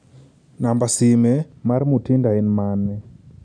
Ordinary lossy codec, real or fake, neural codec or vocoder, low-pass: none; real; none; 9.9 kHz